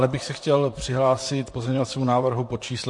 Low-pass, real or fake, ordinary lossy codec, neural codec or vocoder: 10.8 kHz; fake; MP3, 48 kbps; vocoder, 44.1 kHz, 128 mel bands, Pupu-Vocoder